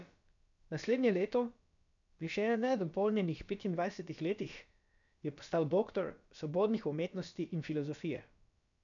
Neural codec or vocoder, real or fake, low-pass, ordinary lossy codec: codec, 16 kHz, about 1 kbps, DyCAST, with the encoder's durations; fake; 7.2 kHz; none